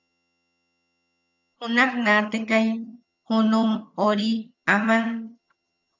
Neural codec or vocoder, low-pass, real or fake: vocoder, 22.05 kHz, 80 mel bands, HiFi-GAN; 7.2 kHz; fake